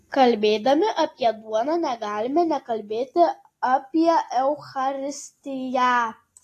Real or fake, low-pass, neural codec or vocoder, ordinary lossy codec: real; 14.4 kHz; none; AAC, 48 kbps